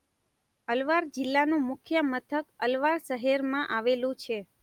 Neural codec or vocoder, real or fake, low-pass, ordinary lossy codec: none; real; 19.8 kHz; Opus, 32 kbps